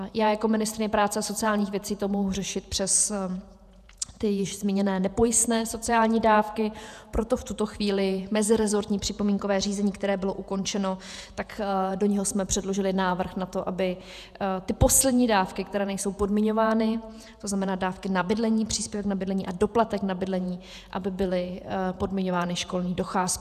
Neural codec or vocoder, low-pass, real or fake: vocoder, 48 kHz, 128 mel bands, Vocos; 14.4 kHz; fake